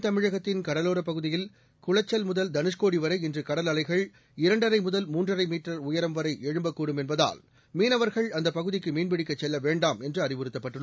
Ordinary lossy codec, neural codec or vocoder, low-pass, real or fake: none; none; none; real